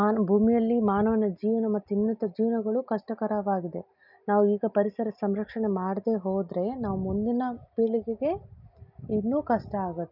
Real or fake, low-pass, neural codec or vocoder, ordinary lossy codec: real; 5.4 kHz; none; none